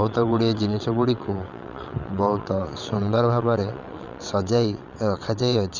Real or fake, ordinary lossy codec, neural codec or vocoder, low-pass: fake; none; vocoder, 22.05 kHz, 80 mel bands, WaveNeXt; 7.2 kHz